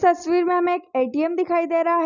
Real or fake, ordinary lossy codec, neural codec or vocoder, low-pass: real; none; none; 7.2 kHz